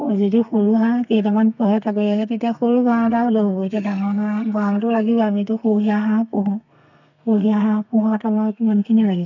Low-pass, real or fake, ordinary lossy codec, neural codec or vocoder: 7.2 kHz; fake; none; codec, 32 kHz, 1.9 kbps, SNAC